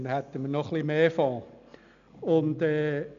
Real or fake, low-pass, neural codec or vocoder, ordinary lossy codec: real; 7.2 kHz; none; none